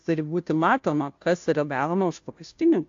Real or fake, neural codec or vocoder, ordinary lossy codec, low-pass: fake; codec, 16 kHz, 0.5 kbps, FunCodec, trained on Chinese and English, 25 frames a second; AAC, 48 kbps; 7.2 kHz